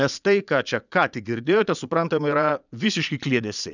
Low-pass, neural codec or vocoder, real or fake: 7.2 kHz; vocoder, 22.05 kHz, 80 mel bands, Vocos; fake